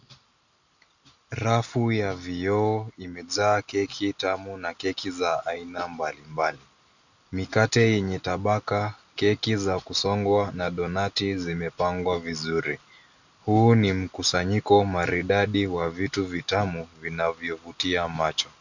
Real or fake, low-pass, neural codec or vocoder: real; 7.2 kHz; none